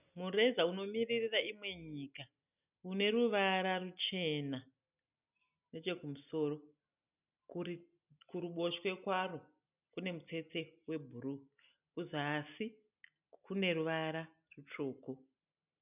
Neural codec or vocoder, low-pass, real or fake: none; 3.6 kHz; real